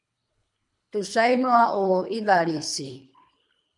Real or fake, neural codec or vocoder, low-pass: fake; codec, 24 kHz, 3 kbps, HILCodec; 10.8 kHz